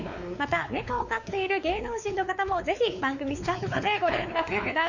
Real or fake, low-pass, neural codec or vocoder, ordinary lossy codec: fake; 7.2 kHz; codec, 16 kHz, 4 kbps, X-Codec, WavLM features, trained on Multilingual LibriSpeech; none